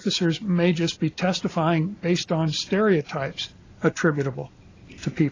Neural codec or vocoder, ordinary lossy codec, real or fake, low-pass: none; AAC, 32 kbps; real; 7.2 kHz